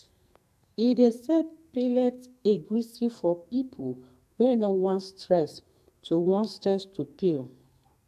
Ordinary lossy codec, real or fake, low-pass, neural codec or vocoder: AAC, 96 kbps; fake; 14.4 kHz; codec, 44.1 kHz, 2.6 kbps, SNAC